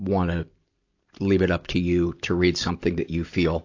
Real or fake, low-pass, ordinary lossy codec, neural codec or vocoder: real; 7.2 kHz; AAC, 48 kbps; none